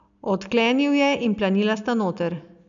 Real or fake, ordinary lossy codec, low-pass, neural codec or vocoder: real; none; 7.2 kHz; none